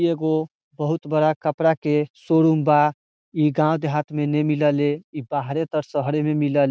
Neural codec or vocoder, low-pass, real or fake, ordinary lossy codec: none; none; real; none